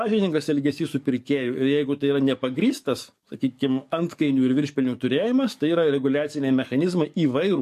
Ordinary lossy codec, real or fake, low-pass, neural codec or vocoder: MP3, 64 kbps; fake; 14.4 kHz; codec, 44.1 kHz, 7.8 kbps, DAC